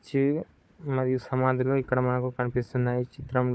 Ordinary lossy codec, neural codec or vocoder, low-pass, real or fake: none; codec, 16 kHz, 4 kbps, FunCodec, trained on Chinese and English, 50 frames a second; none; fake